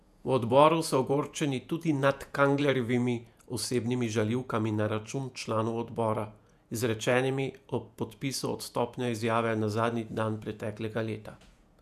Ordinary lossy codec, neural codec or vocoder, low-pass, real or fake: none; vocoder, 48 kHz, 128 mel bands, Vocos; 14.4 kHz; fake